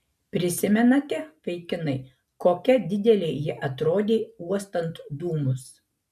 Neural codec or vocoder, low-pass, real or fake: none; 14.4 kHz; real